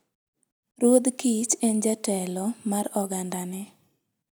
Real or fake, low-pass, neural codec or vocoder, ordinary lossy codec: real; none; none; none